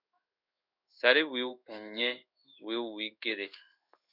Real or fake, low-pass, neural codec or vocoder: fake; 5.4 kHz; codec, 16 kHz in and 24 kHz out, 1 kbps, XY-Tokenizer